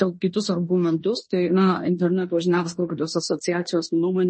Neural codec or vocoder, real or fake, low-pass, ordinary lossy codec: codec, 16 kHz in and 24 kHz out, 0.9 kbps, LongCat-Audio-Codec, fine tuned four codebook decoder; fake; 9.9 kHz; MP3, 32 kbps